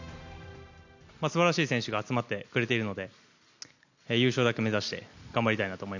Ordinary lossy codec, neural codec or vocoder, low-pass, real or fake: none; none; 7.2 kHz; real